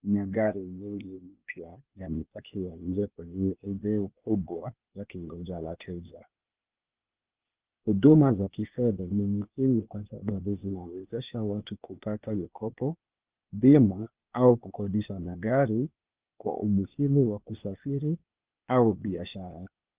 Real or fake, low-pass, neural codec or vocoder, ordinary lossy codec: fake; 3.6 kHz; codec, 16 kHz, 0.8 kbps, ZipCodec; Opus, 32 kbps